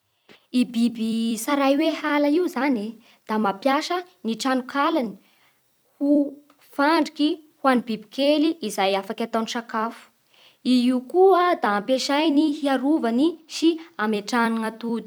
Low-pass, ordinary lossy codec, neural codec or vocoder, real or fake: none; none; vocoder, 44.1 kHz, 128 mel bands every 256 samples, BigVGAN v2; fake